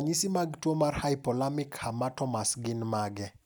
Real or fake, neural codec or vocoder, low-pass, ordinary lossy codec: real; none; none; none